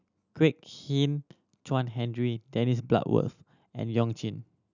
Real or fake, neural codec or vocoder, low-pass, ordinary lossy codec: real; none; 7.2 kHz; none